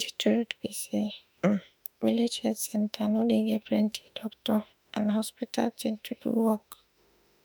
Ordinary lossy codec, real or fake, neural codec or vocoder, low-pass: none; fake; autoencoder, 48 kHz, 32 numbers a frame, DAC-VAE, trained on Japanese speech; none